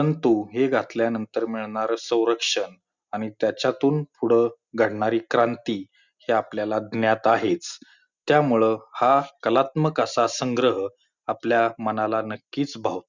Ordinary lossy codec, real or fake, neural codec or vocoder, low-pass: Opus, 64 kbps; real; none; 7.2 kHz